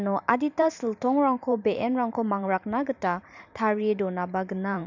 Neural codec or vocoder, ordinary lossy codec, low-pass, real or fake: vocoder, 44.1 kHz, 128 mel bands every 512 samples, BigVGAN v2; none; 7.2 kHz; fake